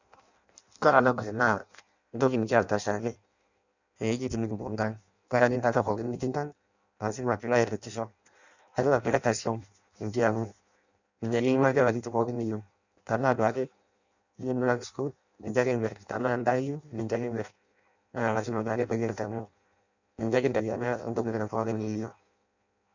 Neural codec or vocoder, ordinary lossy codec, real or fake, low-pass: codec, 16 kHz in and 24 kHz out, 0.6 kbps, FireRedTTS-2 codec; none; fake; 7.2 kHz